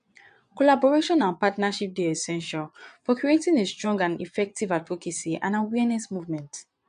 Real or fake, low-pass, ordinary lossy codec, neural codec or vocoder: fake; 9.9 kHz; MP3, 64 kbps; vocoder, 22.05 kHz, 80 mel bands, Vocos